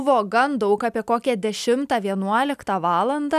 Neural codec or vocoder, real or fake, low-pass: none; real; 14.4 kHz